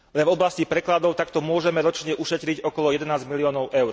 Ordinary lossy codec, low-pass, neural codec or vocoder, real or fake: none; none; none; real